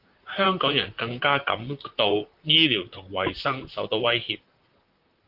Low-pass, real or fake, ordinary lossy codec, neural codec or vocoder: 5.4 kHz; fake; Opus, 32 kbps; vocoder, 44.1 kHz, 128 mel bands, Pupu-Vocoder